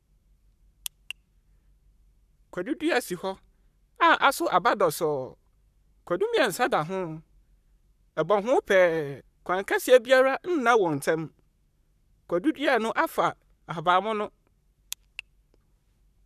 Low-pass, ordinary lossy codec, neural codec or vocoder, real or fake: 14.4 kHz; none; codec, 44.1 kHz, 7.8 kbps, Pupu-Codec; fake